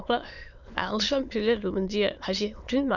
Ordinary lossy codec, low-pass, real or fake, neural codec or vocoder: none; 7.2 kHz; fake; autoencoder, 22.05 kHz, a latent of 192 numbers a frame, VITS, trained on many speakers